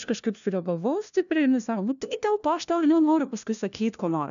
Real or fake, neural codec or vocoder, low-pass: fake; codec, 16 kHz, 1 kbps, FunCodec, trained on LibriTTS, 50 frames a second; 7.2 kHz